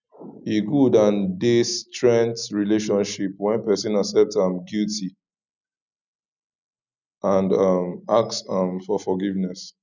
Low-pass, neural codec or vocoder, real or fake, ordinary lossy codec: 7.2 kHz; none; real; none